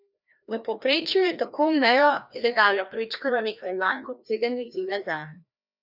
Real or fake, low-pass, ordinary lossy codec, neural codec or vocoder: fake; 5.4 kHz; none; codec, 16 kHz, 1 kbps, FreqCodec, larger model